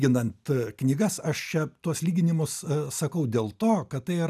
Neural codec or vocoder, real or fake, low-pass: none; real; 14.4 kHz